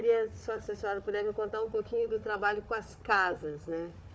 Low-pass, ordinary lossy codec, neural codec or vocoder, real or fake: none; none; codec, 16 kHz, 4 kbps, FunCodec, trained on Chinese and English, 50 frames a second; fake